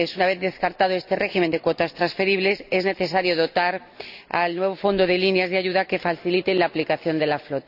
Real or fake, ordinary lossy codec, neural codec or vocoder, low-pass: real; none; none; 5.4 kHz